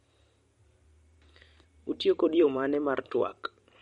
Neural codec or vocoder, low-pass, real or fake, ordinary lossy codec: vocoder, 44.1 kHz, 128 mel bands every 512 samples, BigVGAN v2; 19.8 kHz; fake; MP3, 48 kbps